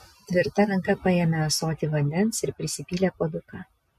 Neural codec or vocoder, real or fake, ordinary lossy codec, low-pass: none; real; MP3, 64 kbps; 14.4 kHz